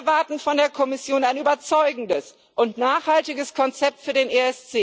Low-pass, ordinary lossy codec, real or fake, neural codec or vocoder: none; none; real; none